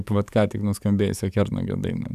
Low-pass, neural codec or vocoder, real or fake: 14.4 kHz; codec, 44.1 kHz, 7.8 kbps, DAC; fake